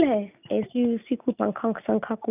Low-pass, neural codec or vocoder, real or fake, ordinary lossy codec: 3.6 kHz; none; real; none